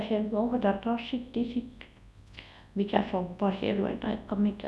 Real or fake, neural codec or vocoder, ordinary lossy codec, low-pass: fake; codec, 24 kHz, 0.9 kbps, WavTokenizer, large speech release; none; none